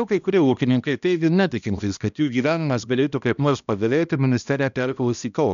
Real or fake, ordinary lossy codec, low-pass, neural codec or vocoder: fake; MP3, 96 kbps; 7.2 kHz; codec, 16 kHz, 1 kbps, X-Codec, HuBERT features, trained on balanced general audio